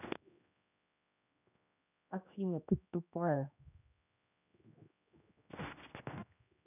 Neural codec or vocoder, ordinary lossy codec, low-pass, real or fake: codec, 16 kHz, 1 kbps, X-Codec, HuBERT features, trained on general audio; none; 3.6 kHz; fake